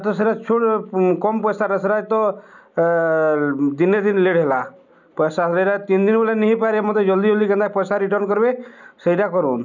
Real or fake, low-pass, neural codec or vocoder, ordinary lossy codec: real; 7.2 kHz; none; none